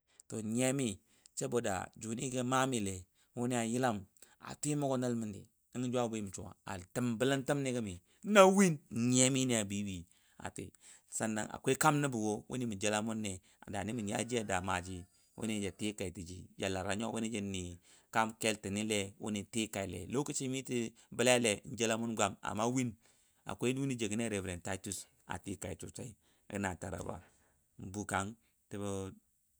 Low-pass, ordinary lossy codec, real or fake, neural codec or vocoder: none; none; real; none